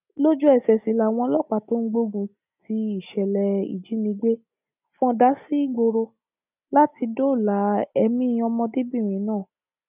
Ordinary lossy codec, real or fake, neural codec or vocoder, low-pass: none; real; none; 3.6 kHz